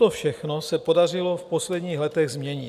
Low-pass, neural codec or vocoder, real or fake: 14.4 kHz; none; real